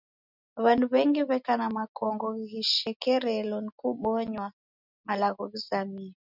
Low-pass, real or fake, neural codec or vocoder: 5.4 kHz; real; none